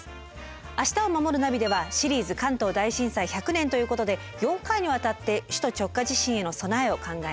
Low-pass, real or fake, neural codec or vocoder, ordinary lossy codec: none; real; none; none